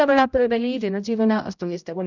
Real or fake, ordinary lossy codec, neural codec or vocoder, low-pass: fake; none; codec, 16 kHz, 0.5 kbps, X-Codec, HuBERT features, trained on general audio; 7.2 kHz